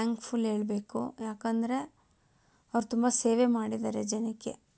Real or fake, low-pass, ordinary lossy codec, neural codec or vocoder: real; none; none; none